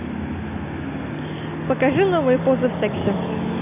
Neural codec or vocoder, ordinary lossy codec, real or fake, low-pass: none; none; real; 3.6 kHz